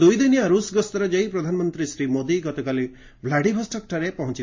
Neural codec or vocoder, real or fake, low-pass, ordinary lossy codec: none; real; 7.2 kHz; MP3, 32 kbps